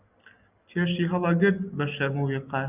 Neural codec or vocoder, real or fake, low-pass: none; real; 3.6 kHz